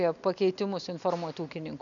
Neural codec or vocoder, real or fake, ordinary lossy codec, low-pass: none; real; MP3, 96 kbps; 7.2 kHz